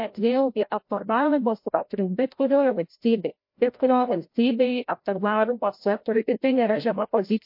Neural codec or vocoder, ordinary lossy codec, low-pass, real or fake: codec, 16 kHz, 0.5 kbps, FreqCodec, larger model; MP3, 32 kbps; 5.4 kHz; fake